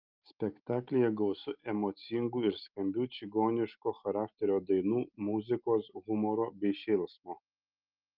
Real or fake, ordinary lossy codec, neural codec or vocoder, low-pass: real; Opus, 24 kbps; none; 5.4 kHz